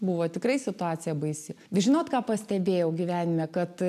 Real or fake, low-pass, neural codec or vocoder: real; 14.4 kHz; none